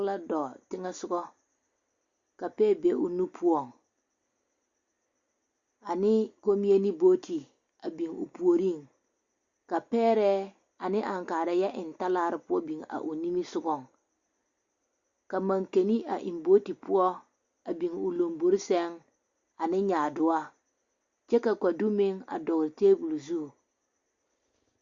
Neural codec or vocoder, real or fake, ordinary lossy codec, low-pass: none; real; Opus, 64 kbps; 7.2 kHz